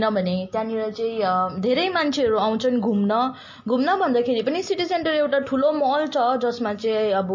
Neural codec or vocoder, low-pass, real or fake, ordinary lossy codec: none; 7.2 kHz; real; MP3, 32 kbps